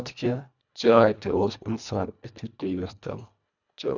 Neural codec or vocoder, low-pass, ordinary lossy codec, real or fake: codec, 24 kHz, 1.5 kbps, HILCodec; 7.2 kHz; none; fake